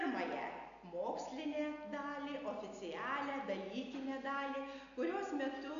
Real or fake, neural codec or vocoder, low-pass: real; none; 7.2 kHz